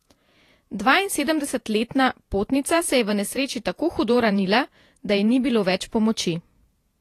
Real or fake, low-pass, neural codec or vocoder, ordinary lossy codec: fake; 14.4 kHz; vocoder, 48 kHz, 128 mel bands, Vocos; AAC, 48 kbps